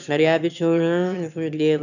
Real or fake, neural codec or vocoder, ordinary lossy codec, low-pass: fake; autoencoder, 22.05 kHz, a latent of 192 numbers a frame, VITS, trained on one speaker; none; 7.2 kHz